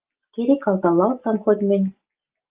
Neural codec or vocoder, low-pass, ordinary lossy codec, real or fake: none; 3.6 kHz; Opus, 32 kbps; real